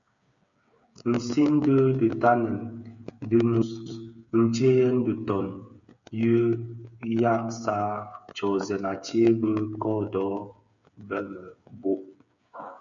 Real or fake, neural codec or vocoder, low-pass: fake; codec, 16 kHz, 8 kbps, FreqCodec, smaller model; 7.2 kHz